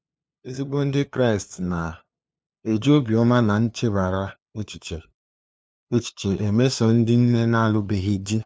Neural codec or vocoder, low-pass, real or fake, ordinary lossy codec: codec, 16 kHz, 2 kbps, FunCodec, trained on LibriTTS, 25 frames a second; none; fake; none